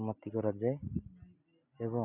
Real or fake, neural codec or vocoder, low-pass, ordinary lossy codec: real; none; 3.6 kHz; none